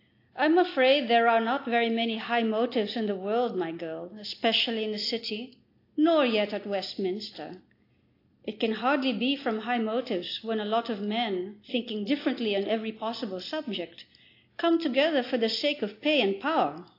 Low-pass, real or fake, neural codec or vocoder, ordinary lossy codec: 5.4 kHz; real; none; AAC, 32 kbps